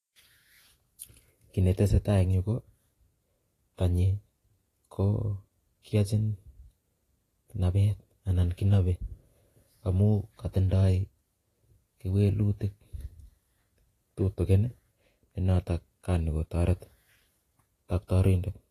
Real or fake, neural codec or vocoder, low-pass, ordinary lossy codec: fake; vocoder, 48 kHz, 128 mel bands, Vocos; 14.4 kHz; AAC, 48 kbps